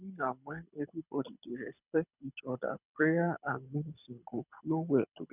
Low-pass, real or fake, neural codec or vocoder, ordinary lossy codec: 3.6 kHz; fake; codec, 24 kHz, 6 kbps, HILCodec; none